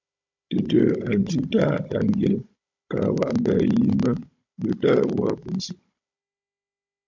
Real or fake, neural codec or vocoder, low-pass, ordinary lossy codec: fake; codec, 16 kHz, 16 kbps, FunCodec, trained on Chinese and English, 50 frames a second; 7.2 kHz; MP3, 64 kbps